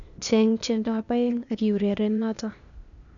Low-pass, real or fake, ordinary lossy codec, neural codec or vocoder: 7.2 kHz; fake; none; codec, 16 kHz, 0.8 kbps, ZipCodec